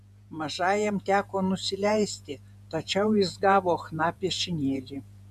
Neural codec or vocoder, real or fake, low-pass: vocoder, 48 kHz, 128 mel bands, Vocos; fake; 14.4 kHz